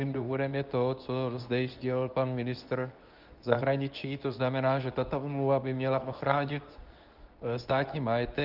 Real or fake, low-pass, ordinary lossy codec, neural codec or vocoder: fake; 5.4 kHz; Opus, 24 kbps; codec, 24 kHz, 0.9 kbps, WavTokenizer, medium speech release version 2